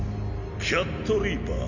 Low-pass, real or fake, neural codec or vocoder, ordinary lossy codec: 7.2 kHz; real; none; none